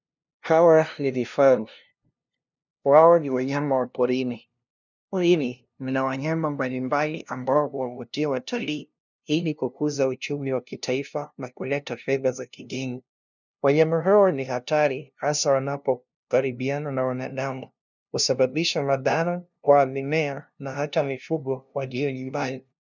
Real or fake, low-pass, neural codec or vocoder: fake; 7.2 kHz; codec, 16 kHz, 0.5 kbps, FunCodec, trained on LibriTTS, 25 frames a second